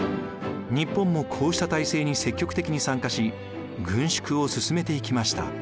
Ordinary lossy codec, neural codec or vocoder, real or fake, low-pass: none; none; real; none